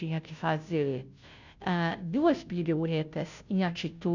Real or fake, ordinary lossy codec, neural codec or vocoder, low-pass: fake; none; codec, 16 kHz, 0.5 kbps, FunCodec, trained on Chinese and English, 25 frames a second; 7.2 kHz